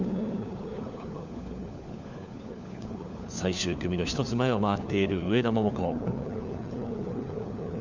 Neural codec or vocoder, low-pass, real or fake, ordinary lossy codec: codec, 16 kHz, 4 kbps, FunCodec, trained on LibriTTS, 50 frames a second; 7.2 kHz; fake; none